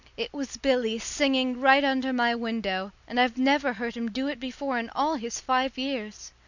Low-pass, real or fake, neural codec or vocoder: 7.2 kHz; real; none